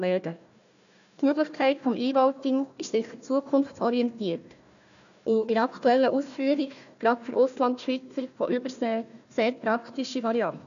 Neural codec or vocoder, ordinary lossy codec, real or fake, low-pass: codec, 16 kHz, 1 kbps, FunCodec, trained on Chinese and English, 50 frames a second; none; fake; 7.2 kHz